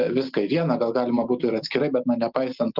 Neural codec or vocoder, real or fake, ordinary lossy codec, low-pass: none; real; Opus, 32 kbps; 5.4 kHz